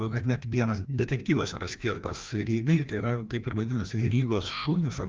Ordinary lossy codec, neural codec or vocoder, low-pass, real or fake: Opus, 24 kbps; codec, 16 kHz, 1 kbps, FreqCodec, larger model; 7.2 kHz; fake